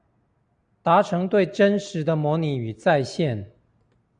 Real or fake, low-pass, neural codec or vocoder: real; 9.9 kHz; none